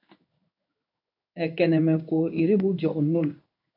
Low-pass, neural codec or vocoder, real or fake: 5.4 kHz; codec, 16 kHz in and 24 kHz out, 1 kbps, XY-Tokenizer; fake